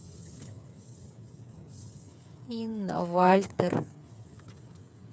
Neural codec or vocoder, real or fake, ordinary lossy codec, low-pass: codec, 16 kHz, 8 kbps, FreqCodec, smaller model; fake; none; none